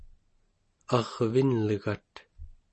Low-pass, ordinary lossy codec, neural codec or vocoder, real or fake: 10.8 kHz; MP3, 32 kbps; none; real